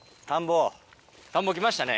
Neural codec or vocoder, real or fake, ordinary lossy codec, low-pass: none; real; none; none